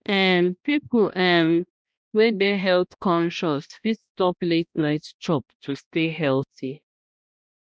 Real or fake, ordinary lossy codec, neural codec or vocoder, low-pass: fake; none; codec, 16 kHz, 1 kbps, X-Codec, HuBERT features, trained on balanced general audio; none